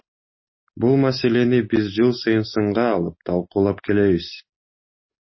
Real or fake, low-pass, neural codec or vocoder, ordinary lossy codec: real; 7.2 kHz; none; MP3, 24 kbps